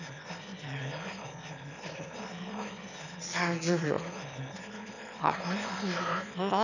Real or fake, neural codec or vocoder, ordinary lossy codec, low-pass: fake; autoencoder, 22.05 kHz, a latent of 192 numbers a frame, VITS, trained on one speaker; none; 7.2 kHz